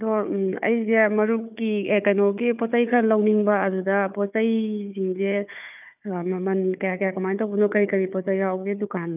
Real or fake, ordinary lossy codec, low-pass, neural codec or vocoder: fake; none; 3.6 kHz; codec, 16 kHz, 4 kbps, FunCodec, trained on Chinese and English, 50 frames a second